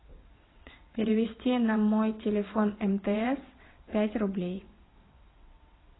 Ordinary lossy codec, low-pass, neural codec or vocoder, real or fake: AAC, 16 kbps; 7.2 kHz; vocoder, 22.05 kHz, 80 mel bands, WaveNeXt; fake